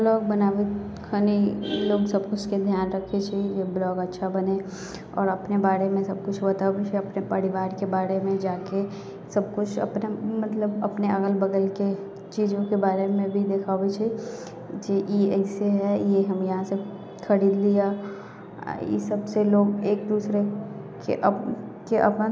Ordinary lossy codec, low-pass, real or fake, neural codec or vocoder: none; none; real; none